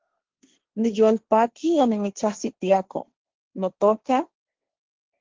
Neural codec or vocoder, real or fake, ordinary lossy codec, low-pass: codec, 16 kHz, 1.1 kbps, Voila-Tokenizer; fake; Opus, 16 kbps; 7.2 kHz